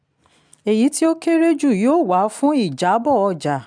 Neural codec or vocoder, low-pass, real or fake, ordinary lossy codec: none; 9.9 kHz; real; none